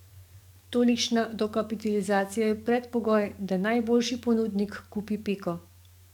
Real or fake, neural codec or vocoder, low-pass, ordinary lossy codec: fake; codec, 44.1 kHz, 7.8 kbps, DAC; 19.8 kHz; none